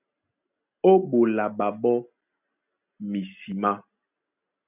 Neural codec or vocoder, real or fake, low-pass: none; real; 3.6 kHz